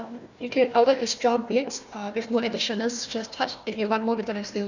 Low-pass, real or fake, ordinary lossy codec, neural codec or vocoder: 7.2 kHz; fake; none; codec, 16 kHz, 1 kbps, FunCodec, trained on Chinese and English, 50 frames a second